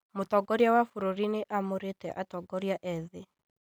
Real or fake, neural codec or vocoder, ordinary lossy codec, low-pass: real; none; none; none